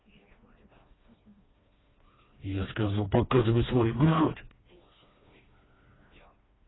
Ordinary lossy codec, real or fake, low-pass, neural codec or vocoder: AAC, 16 kbps; fake; 7.2 kHz; codec, 16 kHz, 2 kbps, FreqCodec, smaller model